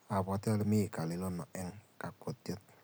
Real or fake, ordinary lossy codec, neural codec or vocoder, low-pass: real; none; none; none